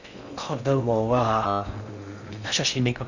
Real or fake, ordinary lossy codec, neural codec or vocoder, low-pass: fake; Opus, 64 kbps; codec, 16 kHz in and 24 kHz out, 0.6 kbps, FocalCodec, streaming, 4096 codes; 7.2 kHz